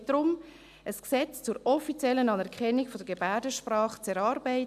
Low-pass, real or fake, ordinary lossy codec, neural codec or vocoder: 14.4 kHz; real; none; none